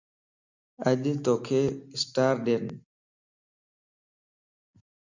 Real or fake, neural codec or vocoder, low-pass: real; none; 7.2 kHz